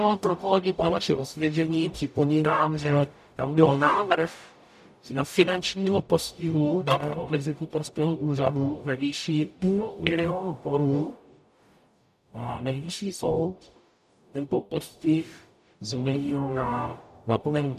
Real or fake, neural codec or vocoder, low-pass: fake; codec, 44.1 kHz, 0.9 kbps, DAC; 14.4 kHz